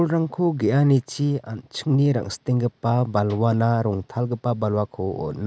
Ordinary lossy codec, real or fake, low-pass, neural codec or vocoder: none; real; none; none